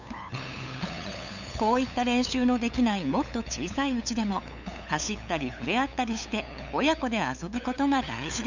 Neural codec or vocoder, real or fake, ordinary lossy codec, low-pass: codec, 16 kHz, 8 kbps, FunCodec, trained on LibriTTS, 25 frames a second; fake; none; 7.2 kHz